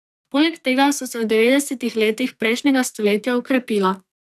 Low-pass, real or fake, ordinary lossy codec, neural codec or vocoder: 14.4 kHz; fake; none; codec, 44.1 kHz, 2.6 kbps, SNAC